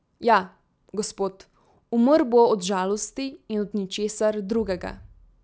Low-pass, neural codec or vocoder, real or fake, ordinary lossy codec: none; none; real; none